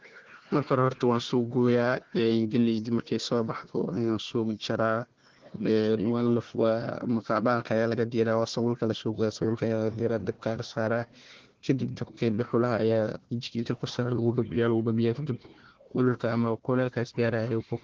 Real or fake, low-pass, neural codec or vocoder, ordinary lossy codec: fake; 7.2 kHz; codec, 16 kHz, 1 kbps, FunCodec, trained on Chinese and English, 50 frames a second; Opus, 16 kbps